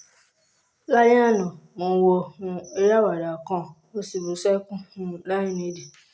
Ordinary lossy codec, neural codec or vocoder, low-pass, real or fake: none; none; none; real